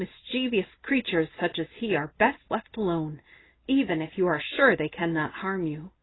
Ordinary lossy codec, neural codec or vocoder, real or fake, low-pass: AAC, 16 kbps; none; real; 7.2 kHz